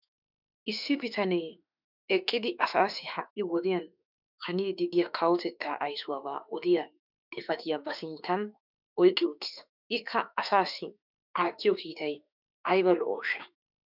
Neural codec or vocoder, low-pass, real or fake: autoencoder, 48 kHz, 32 numbers a frame, DAC-VAE, trained on Japanese speech; 5.4 kHz; fake